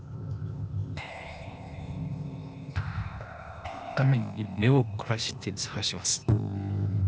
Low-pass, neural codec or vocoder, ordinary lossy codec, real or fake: none; codec, 16 kHz, 0.8 kbps, ZipCodec; none; fake